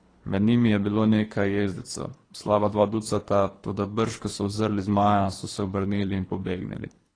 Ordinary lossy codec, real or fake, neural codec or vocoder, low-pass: AAC, 32 kbps; fake; codec, 24 kHz, 3 kbps, HILCodec; 9.9 kHz